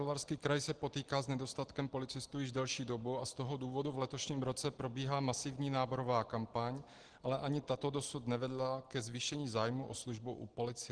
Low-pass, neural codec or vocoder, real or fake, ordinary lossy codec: 9.9 kHz; none; real; Opus, 16 kbps